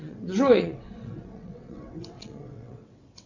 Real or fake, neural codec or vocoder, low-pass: fake; vocoder, 22.05 kHz, 80 mel bands, WaveNeXt; 7.2 kHz